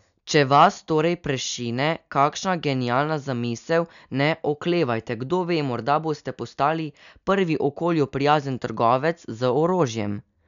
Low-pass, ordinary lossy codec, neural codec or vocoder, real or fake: 7.2 kHz; none; none; real